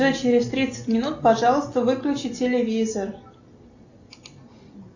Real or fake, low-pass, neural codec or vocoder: real; 7.2 kHz; none